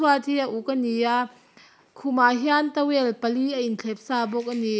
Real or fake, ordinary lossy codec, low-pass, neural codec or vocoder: real; none; none; none